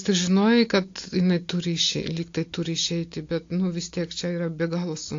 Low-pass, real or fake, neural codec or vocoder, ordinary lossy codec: 7.2 kHz; real; none; AAC, 48 kbps